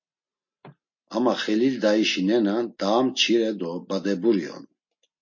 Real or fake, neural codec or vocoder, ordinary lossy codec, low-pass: real; none; MP3, 32 kbps; 7.2 kHz